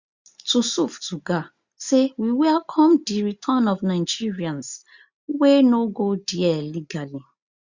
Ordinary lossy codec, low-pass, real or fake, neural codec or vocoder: Opus, 64 kbps; 7.2 kHz; real; none